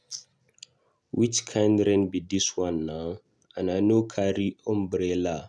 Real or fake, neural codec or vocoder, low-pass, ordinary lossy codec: real; none; none; none